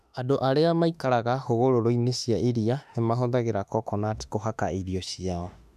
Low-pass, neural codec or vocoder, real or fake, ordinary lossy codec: 14.4 kHz; autoencoder, 48 kHz, 32 numbers a frame, DAC-VAE, trained on Japanese speech; fake; none